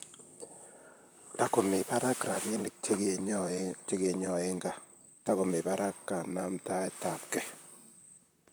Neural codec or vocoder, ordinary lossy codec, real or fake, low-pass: vocoder, 44.1 kHz, 128 mel bands, Pupu-Vocoder; none; fake; none